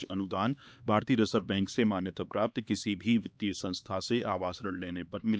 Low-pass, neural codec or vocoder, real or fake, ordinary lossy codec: none; codec, 16 kHz, 2 kbps, X-Codec, HuBERT features, trained on LibriSpeech; fake; none